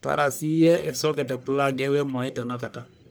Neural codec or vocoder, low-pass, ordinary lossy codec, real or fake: codec, 44.1 kHz, 1.7 kbps, Pupu-Codec; none; none; fake